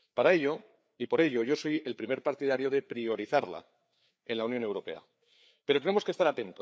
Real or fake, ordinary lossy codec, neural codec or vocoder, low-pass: fake; none; codec, 16 kHz, 4 kbps, FreqCodec, larger model; none